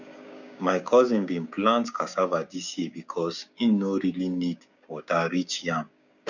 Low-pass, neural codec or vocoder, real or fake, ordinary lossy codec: 7.2 kHz; codec, 16 kHz, 6 kbps, DAC; fake; none